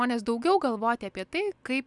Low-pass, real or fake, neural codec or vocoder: 10.8 kHz; real; none